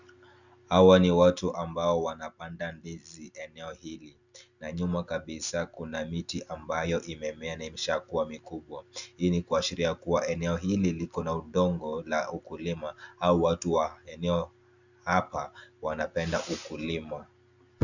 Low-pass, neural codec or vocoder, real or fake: 7.2 kHz; none; real